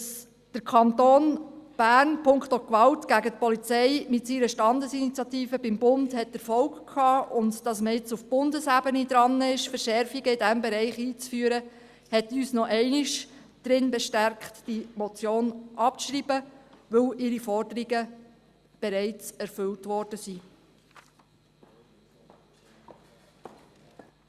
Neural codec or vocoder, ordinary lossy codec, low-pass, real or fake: none; Opus, 64 kbps; 14.4 kHz; real